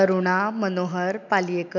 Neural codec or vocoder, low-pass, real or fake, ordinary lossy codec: none; 7.2 kHz; real; none